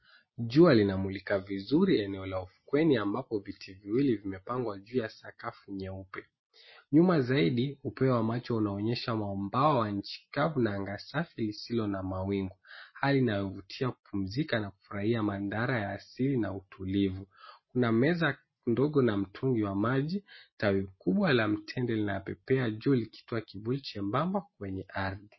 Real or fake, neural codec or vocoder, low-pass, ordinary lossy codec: real; none; 7.2 kHz; MP3, 24 kbps